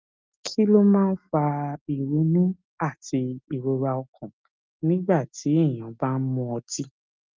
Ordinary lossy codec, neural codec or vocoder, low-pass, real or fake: Opus, 24 kbps; none; 7.2 kHz; real